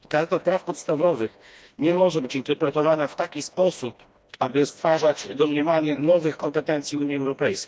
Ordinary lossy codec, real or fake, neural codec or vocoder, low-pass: none; fake; codec, 16 kHz, 1 kbps, FreqCodec, smaller model; none